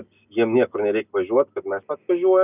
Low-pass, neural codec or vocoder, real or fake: 3.6 kHz; none; real